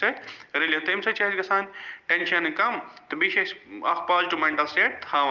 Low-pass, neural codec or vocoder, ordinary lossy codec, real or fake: 7.2 kHz; none; Opus, 32 kbps; real